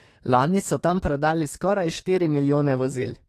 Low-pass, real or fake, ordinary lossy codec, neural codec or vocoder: 14.4 kHz; fake; AAC, 48 kbps; codec, 32 kHz, 1.9 kbps, SNAC